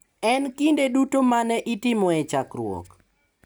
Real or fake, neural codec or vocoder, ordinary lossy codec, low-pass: real; none; none; none